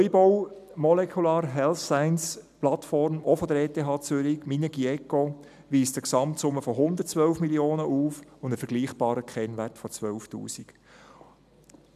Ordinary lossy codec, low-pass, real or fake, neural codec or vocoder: none; 14.4 kHz; real; none